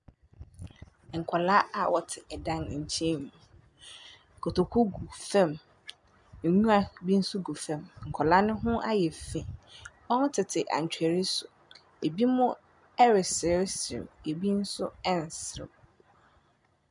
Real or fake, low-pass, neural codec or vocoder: real; 10.8 kHz; none